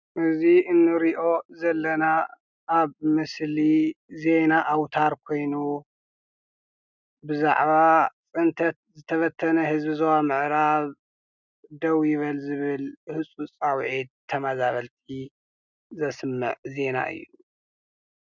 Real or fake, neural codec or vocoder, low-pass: real; none; 7.2 kHz